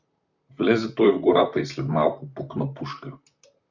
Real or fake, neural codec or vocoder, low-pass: fake; vocoder, 44.1 kHz, 128 mel bands, Pupu-Vocoder; 7.2 kHz